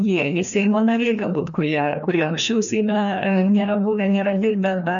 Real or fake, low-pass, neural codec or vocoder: fake; 7.2 kHz; codec, 16 kHz, 1 kbps, FreqCodec, larger model